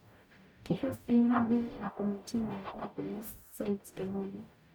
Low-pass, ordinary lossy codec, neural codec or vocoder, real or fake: none; none; codec, 44.1 kHz, 0.9 kbps, DAC; fake